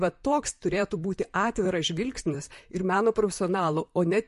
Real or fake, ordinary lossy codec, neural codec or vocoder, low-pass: fake; MP3, 48 kbps; vocoder, 44.1 kHz, 128 mel bands, Pupu-Vocoder; 14.4 kHz